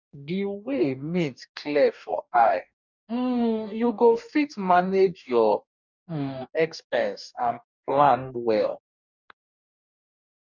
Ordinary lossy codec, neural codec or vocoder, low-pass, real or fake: none; codec, 44.1 kHz, 2.6 kbps, DAC; 7.2 kHz; fake